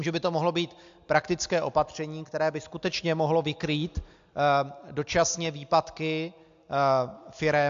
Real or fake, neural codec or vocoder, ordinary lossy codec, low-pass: real; none; AAC, 64 kbps; 7.2 kHz